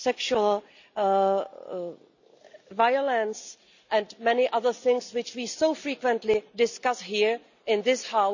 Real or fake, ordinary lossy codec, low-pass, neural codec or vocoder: real; none; 7.2 kHz; none